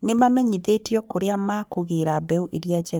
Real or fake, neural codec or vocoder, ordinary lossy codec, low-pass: fake; codec, 44.1 kHz, 3.4 kbps, Pupu-Codec; none; none